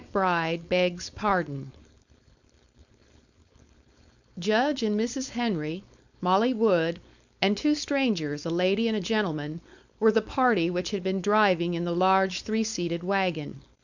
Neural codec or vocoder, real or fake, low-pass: codec, 16 kHz, 4.8 kbps, FACodec; fake; 7.2 kHz